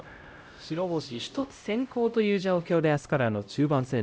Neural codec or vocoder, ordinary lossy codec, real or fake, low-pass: codec, 16 kHz, 0.5 kbps, X-Codec, HuBERT features, trained on LibriSpeech; none; fake; none